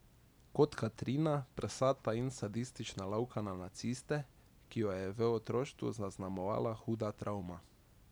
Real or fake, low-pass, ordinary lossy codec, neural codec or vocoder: real; none; none; none